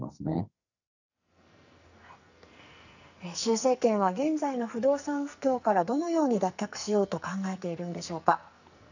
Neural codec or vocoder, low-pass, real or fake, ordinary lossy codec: codec, 44.1 kHz, 2.6 kbps, SNAC; 7.2 kHz; fake; none